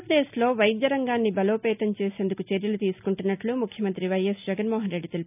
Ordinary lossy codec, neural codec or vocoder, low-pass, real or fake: none; none; 3.6 kHz; real